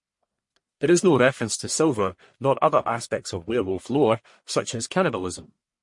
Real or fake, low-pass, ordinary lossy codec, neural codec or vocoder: fake; 10.8 kHz; MP3, 48 kbps; codec, 44.1 kHz, 1.7 kbps, Pupu-Codec